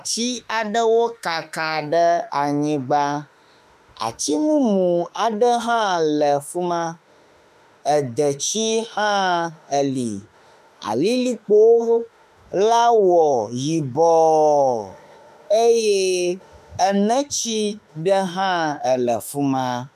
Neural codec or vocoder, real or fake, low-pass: autoencoder, 48 kHz, 32 numbers a frame, DAC-VAE, trained on Japanese speech; fake; 14.4 kHz